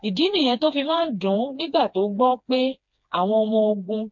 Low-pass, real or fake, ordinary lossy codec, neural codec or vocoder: 7.2 kHz; fake; MP3, 48 kbps; codec, 16 kHz, 2 kbps, FreqCodec, smaller model